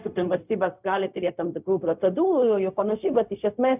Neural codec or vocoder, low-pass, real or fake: codec, 16 kHz, 0.4 kbps, LongCat-Audio-Codec; 3.6 kHz; fake